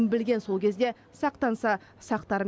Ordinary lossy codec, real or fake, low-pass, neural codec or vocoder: none; real; none; none